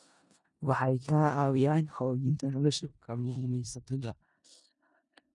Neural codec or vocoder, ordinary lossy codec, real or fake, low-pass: codec, 16 kHz in and 24 kHz out, 0.4 kbps, LongCat-Audio-Codec, four codebook decoder; none; fake; 10.8 kHz